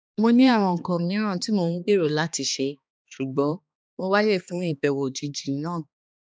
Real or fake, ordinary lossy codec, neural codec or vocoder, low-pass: fake; none; codec, 16 kHz, 2 kbps, X-Codec, HuBERT features, trained on balanced general audio; none